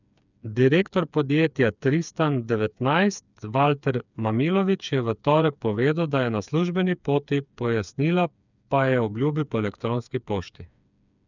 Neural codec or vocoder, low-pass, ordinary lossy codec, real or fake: codec, 16 kHz, 4 kbps, FreqCodec, smaller model; 7.2 kHz; none; fake